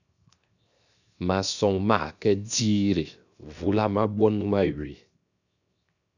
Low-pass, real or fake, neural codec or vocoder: 7.2 kHz; fake; codec, 16 kHz, 0.7 kbps, FocalCodec